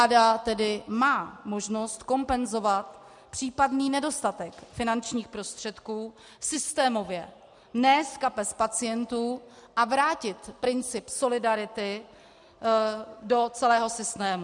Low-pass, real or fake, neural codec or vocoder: 10.8 kHz; real; none